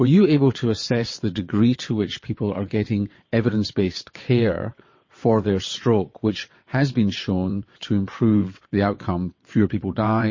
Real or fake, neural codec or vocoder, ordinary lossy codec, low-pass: fake; vocoder, 22.05 kHz, 80 mel bands, WaveNeXt; MP3, 32 kbps; 7.2 kHz